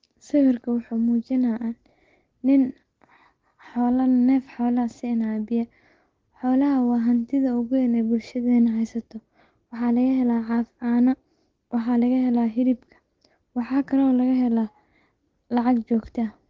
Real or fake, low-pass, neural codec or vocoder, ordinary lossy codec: real; 7.2 kHz; none; Opus, 16 kbps